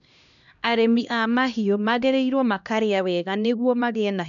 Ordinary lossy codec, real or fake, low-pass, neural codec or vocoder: none; fake; 7.2 kHz; codec, 16 kHz, 1 kbps, X-Codec, HuBERT features, trained on LibriSpeech